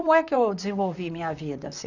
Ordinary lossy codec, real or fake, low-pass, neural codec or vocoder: none; fake; 7.2 kHz; vocoder, 44.1 kHz, 128 mel bands, Pupu-Vocoder